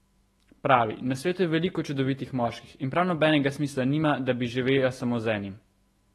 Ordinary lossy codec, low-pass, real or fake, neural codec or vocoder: AAC, 32 kbps; 19.8 kHz; fake; autoencoder, 48 kHz, 128 numbers a frame, DAC-VAE, trained on Japanese speech